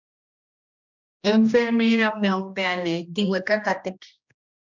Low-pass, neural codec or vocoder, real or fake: 7.2 kHz; codec, 16 kHz, 1 kbps, X-Codec, HuBERT features, trained on general audio; fake